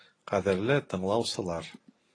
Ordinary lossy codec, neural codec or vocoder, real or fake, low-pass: AAC, 32 kbps; none; real; 9.9 kHz